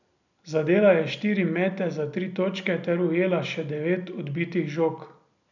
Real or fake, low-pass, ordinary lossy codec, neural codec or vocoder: real; 7.2 kHz; none; none